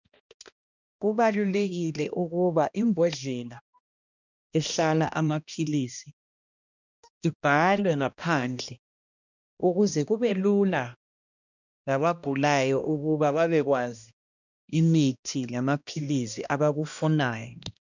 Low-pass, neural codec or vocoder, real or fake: 7.2 kHz; codec, 16 kHz, 1 kbps, X-Codec, HuBERT features, trained on balanced general audio; fake